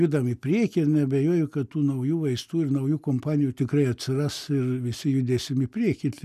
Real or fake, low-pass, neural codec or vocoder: real; 14.4 kHz; none